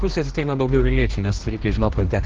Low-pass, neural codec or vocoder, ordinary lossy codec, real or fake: 7.2 kHz; codec, 16 kHz, 1 kbps, X-Codec, HuBERT features, trained on general audio; Opus, 16 kbps; fake